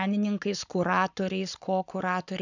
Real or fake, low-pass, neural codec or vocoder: fake; 7.2 kHz; codec, 44.1 kHz, 7.8 kbps, Pupu-Codec